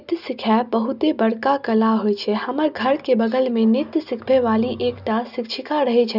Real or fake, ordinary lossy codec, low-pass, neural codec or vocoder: real; none; 5.4 kHz; none